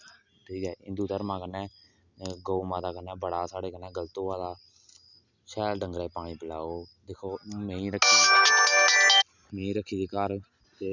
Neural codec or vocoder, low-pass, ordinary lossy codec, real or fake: none; 7.2 kHz; none; real